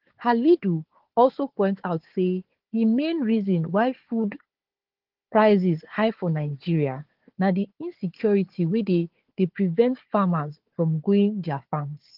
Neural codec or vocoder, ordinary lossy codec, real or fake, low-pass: codec, 16 kHz, 4 kbps, FunCodec, trained on Chinese and English, 50 frames a second; Opus, 16 kbps; fake; 5.4 kHz